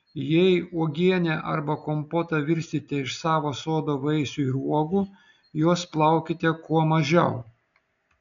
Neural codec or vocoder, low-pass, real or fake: none; 7.2 kHz; real